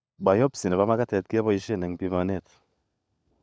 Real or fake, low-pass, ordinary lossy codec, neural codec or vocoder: fake; none; none; codec, 16 kHz, 16 kbps, FunCodec, trained on LibriTTS, 50 frames a second